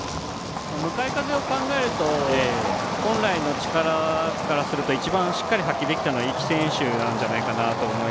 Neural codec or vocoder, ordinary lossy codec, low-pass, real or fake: none; none; none; real